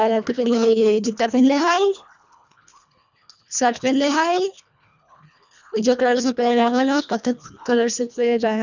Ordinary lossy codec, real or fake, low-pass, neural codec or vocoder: none; fake; 7.2 kHz; codec, 24 kHz, 1.5 kbps, HILCodec